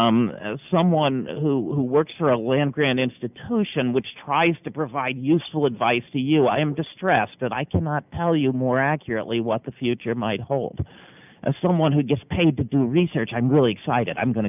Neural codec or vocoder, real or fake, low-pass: none; real; 3.6 kHz